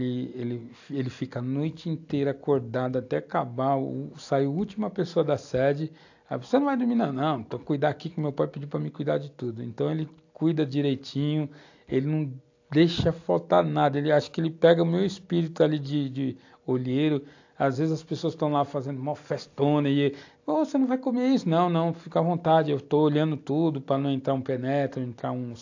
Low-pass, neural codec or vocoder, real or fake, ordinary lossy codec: 7.2 kHz; none; real; AAC, 48 kbps